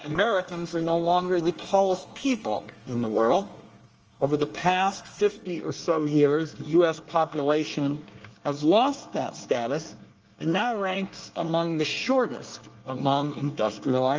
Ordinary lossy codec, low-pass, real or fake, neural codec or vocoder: Opus, 24 kbps; 7.2 kHz; fake; codec, 24 kHz, 1 kbps, SNAC